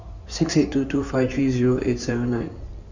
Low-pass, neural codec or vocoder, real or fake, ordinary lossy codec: 7.2 kHz; codec, 16 kHz in and 24 kHz out, 2.2 kbps, FireRedTTS-2 codec; fake; none